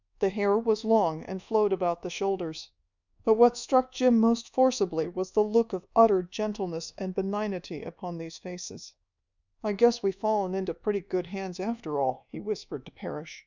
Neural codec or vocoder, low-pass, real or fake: codec, 24 kHz, 1.2 kbps, DualCodec; 7.2 kHz; fake